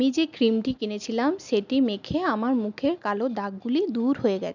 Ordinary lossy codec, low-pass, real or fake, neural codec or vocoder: none; 7.2 kHz; real; none